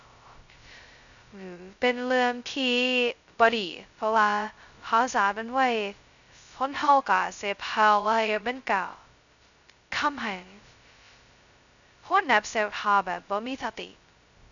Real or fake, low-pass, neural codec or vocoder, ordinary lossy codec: fake; 7.2 kHz; codec, 16 kHz, 0.2 kbps, FocalCodec; MP3, 96 kbps